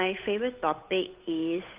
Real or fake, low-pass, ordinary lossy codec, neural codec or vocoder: fake; 3.6 kHz; Opus, 24 kbps; codec, 16 kHz, 8 kbps, FunCodec, trained on Chinese and English, 25 frames a second